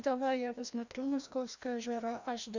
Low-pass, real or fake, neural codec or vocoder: 7.2 kHz; fake; codec, 16 kHz, 1 kbps, FreqCodec, larger model